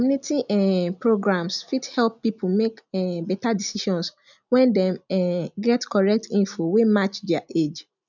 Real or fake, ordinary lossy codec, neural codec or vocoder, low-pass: real; none; none; 7.2 kHz